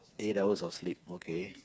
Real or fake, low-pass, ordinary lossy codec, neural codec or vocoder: fake; none; none; codec, 16 kHz, 4 kbps, FreqCodec, smaller model